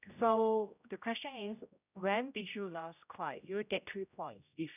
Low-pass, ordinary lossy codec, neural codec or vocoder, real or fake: 3.6 kHz; none; codec, 16 kHz, 0.5 kbps, X-Codec, HuBERT features, trained on general audio; fake